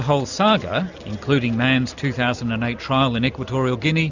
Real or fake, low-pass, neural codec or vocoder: real; 7.2 kHz; none